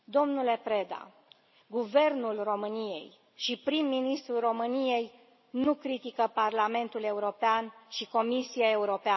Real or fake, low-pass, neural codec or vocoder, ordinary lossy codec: real; 7.2 kHz; none; MP3, 24 kbps